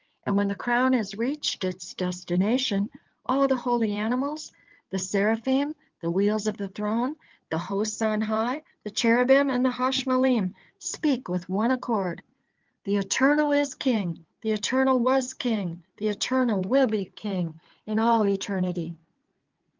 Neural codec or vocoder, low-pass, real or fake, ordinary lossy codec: codec, 16 kHz in and 24 kHz out, 2.2 kbps, FireRedTTS-2 codec; 7.2 kHz; fake; Opus, 32 kbps